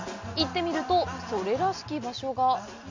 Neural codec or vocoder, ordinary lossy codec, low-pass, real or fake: none; MP3, 64 kbps; 7.2 kHz; real